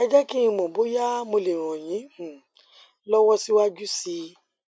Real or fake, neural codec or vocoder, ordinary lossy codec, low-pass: real; none; none; none